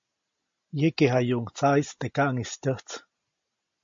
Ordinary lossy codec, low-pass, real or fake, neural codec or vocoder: MP3, 48 kbps; 7.2 kHz; real; none